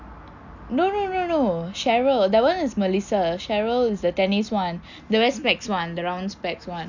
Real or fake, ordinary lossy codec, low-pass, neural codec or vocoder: real; none; 7.2 kHz; none